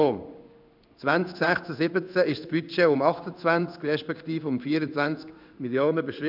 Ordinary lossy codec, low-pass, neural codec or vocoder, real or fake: none; 5.4 kHz; codec, 16 kHz in and 24 kHz out, 1 kbps, XY-Tokenizer; fake